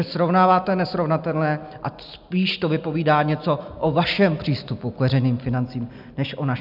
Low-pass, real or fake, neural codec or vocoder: 5.4 kHz; real; none